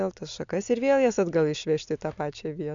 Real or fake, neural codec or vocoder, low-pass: real; none; 7.2 kHz